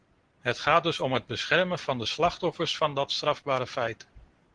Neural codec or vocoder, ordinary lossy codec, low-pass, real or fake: none; Opus, 16 kbps; 9.9 kHz; real